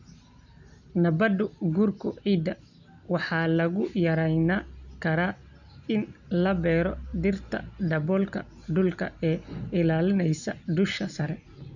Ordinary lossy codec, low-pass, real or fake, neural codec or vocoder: none; 7.2 kHz; real; none